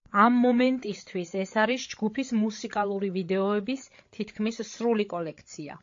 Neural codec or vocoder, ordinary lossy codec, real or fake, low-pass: codec, 16 kHz, 8 kbps, FreqCodec, larger model; AAC, 48 kbps; fake; 7.2 kHz